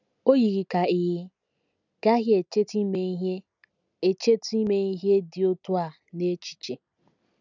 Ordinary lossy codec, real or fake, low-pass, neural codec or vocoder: none; real; 7.2 kHz; none